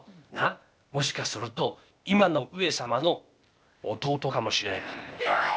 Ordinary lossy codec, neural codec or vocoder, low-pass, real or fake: none; codec, 16 kHz, 0.8 kbps, ZipCodec; none; fake